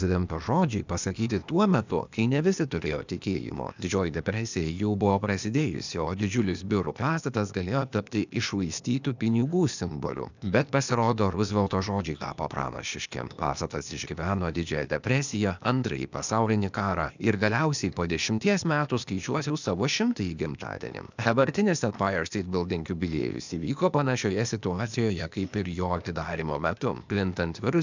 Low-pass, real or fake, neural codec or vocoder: 7.2 kHz; fake; codec, 16 kHz, 0.8 kbps, ZipCodec